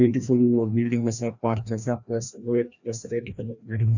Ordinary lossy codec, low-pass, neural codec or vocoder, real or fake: none; 7.2 kHz; codec, 16 kHz, 1 kbps, FreqCodec, larger model; fake